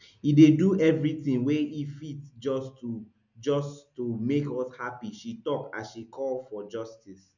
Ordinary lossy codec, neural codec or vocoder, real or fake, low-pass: none; none; real; 7.2 kHz